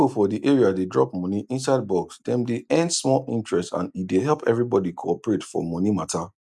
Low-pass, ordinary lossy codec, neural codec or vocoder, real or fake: none; none; none; real